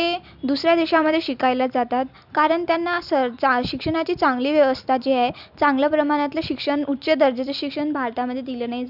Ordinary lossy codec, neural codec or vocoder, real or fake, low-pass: none; none; real; 5.4 kHz